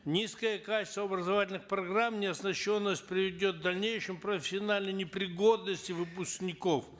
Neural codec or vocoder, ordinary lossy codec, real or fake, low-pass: none; none; real; none